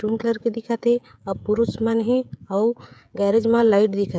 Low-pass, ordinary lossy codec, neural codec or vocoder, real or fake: none; none; codec, 16 kHz, 16 kbps, FreqCodec, smaller model; fake